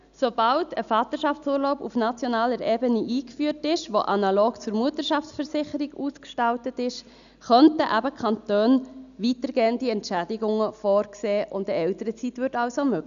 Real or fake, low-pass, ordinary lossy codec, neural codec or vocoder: real; 7.2 kHz; none; none